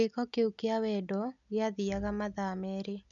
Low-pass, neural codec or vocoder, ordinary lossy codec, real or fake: 7.2 kHz; none; none; real